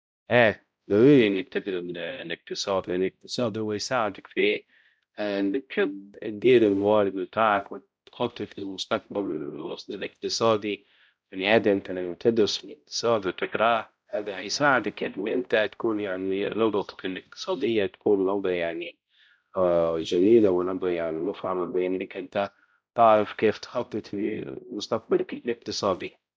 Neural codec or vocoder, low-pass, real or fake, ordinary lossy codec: codec, 16 kHz, 0.5 kbps, X-Codec, HuBERT features, trained on balanced general audio; none; fake; none